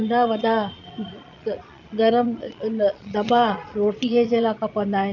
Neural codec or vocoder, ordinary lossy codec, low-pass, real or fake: vocoder, 22.05 kHz, 80 mel bands, Vocos; none; 7.2 kHz; fake